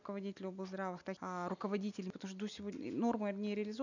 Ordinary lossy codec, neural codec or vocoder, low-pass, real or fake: none; none; 7.2 kHz; real